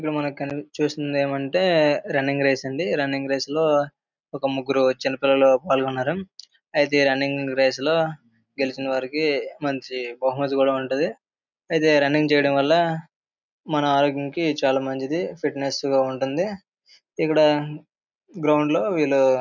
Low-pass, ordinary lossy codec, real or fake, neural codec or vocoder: 7.2 kHz; none; real; none